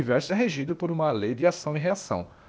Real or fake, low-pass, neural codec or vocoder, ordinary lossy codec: fake; none; codec, 16 kHz, 0.8 kbps, ZipCodec; none